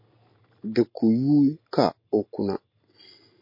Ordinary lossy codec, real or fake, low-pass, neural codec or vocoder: MP3, 32 kbps; real; 5.4 kHz; none